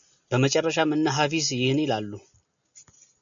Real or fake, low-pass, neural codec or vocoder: real; 7.2 kHz; none